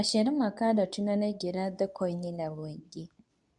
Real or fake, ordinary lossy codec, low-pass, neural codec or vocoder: fake; none; 10.8 kHz; codec, 24 kHz, 0.9 kbps, WavTokenizer, medium speech release version 2